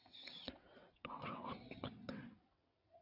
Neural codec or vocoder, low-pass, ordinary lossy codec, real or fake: vocoder, 22.05 kHz, 80 mel bands, WaveNeXt; 5.4 kHz; AAC, 32 kbps; fake